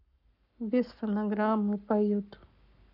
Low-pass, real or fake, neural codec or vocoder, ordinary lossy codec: 5.4 kHz; fake; codec, 44.1 kHz, 7.8 kbps, Pupu-Codec; none